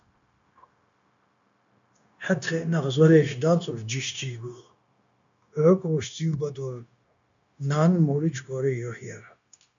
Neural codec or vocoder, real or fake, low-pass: codec, 16 kHz, 0.9 kbps, LongCat-Audio-Codec; fake; 7.2 kHz